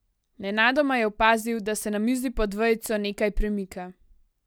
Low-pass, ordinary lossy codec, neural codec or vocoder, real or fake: none; none; none; real